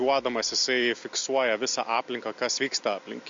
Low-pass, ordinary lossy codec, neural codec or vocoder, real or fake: 7.2 kHz; MP3, 48 kbps; none; real